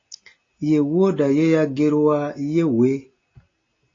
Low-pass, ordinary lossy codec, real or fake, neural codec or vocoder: 7.2 kHz; AAC, 32 kbps; real; none